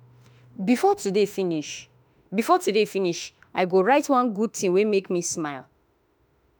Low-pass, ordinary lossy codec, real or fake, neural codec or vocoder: none; none; fake; autoencoder, 48 kHz, 32 numbers a frame, DAC-VAE, trained on Japanese speech